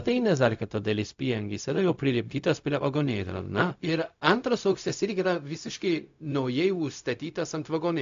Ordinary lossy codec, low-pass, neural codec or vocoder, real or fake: AAC, 64 kbps; 7.2 kHz; codec, 16 kHz, 0.4 kbps, LongCat-Audio-Codec; fake